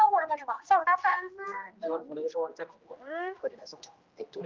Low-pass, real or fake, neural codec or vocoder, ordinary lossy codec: 7.2 kHz; fake; codec, 16 kHz, 1 kbps, X-Codec, HuBERT features, trained on general audio; Opus, 32 kbps